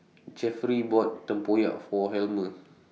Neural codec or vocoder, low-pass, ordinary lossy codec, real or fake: none; none; none; real